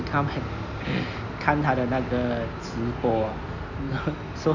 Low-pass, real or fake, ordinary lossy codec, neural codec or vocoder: 7.2 kHz; real; none; none